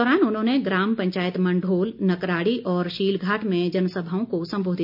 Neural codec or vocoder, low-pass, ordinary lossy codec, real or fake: none; 5.4 kHz; none; real